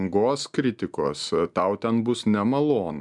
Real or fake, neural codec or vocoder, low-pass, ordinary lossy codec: real; none; 10.8 kHz; MP3, 96 kbps